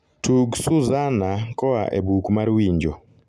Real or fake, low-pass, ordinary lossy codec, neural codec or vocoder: real; none; none; none